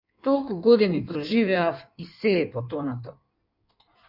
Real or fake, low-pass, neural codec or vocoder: fake; 5.4 kHz; codec, 16 kHz in and 24 kHz out, 1.1 kbps, FireRedTTS-2 codec